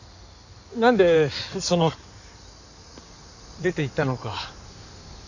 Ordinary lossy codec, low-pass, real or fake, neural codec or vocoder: none; 7.2 kHz; fake; codec, 16 kHz in and 24 kHz out, 2.2 kbps, FireRedTTS-2 codec